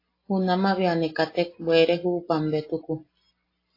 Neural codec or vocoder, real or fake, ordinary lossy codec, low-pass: none; real; AAC, 24 kbps; 5.4 kHz